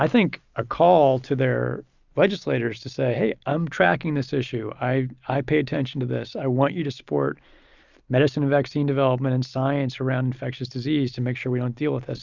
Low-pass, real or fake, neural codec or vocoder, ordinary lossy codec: 7.2 kHz; real; none; Opus, 64 kbps